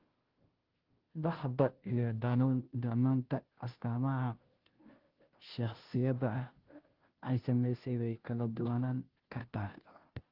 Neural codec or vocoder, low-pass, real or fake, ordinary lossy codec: codec, 16 kHz, 0.5 kbps, FunCodec, trained on Chinese and English, 25 frames a second; 5.4 kHz; fake; Opus, 16 kbps